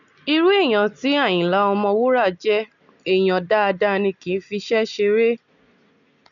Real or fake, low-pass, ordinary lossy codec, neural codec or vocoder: real; 7.2 kHz; none; none